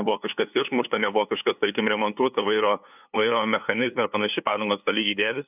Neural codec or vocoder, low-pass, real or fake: codec, 16 kHz, 2 kbps, FunCodec, trained on LibriTTS, 25 frames a second; 3.6 kHz; fake